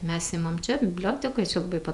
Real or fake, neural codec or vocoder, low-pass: fake; vocoder, 48 kHz, 128 mel bands, Vocos; 10.8 kHz